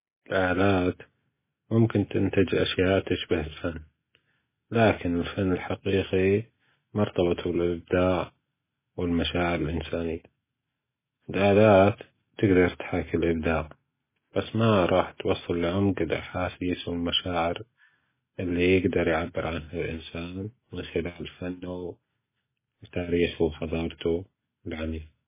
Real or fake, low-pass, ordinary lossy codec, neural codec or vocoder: real; 3.6 kHz; MP3, 16 kbps; none